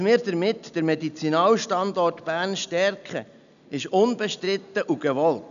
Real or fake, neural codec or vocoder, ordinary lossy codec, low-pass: real; none; none; 7.2 kHz